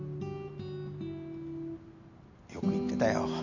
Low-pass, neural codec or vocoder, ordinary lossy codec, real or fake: 7.2 kHz; none; none; real